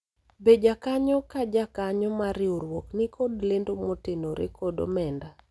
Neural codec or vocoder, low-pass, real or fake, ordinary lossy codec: none; none; real; none